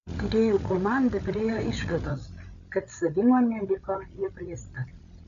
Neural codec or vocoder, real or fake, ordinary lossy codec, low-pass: codec, 16 kHz, 8 kbps, FreqCodec, larger model; fake; AAC, 96 kbps; 7.2 kHz